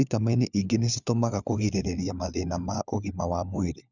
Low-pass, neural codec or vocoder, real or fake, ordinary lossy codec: 7.2 kHz; codec, 16 kHz, 4 kbps, FunCodec, trained on LibriTTS, 50 frames a second; fake; none